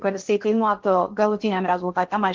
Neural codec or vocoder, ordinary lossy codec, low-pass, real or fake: codec, 16 kHz in and 24 kHz out, 0.8 kbps, FocalCodec, streaming, 65536 codes; Opus, 16 kbps; 7.2 kHz; fake